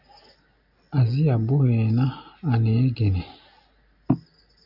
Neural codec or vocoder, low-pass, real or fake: none; 5.4 kHz; real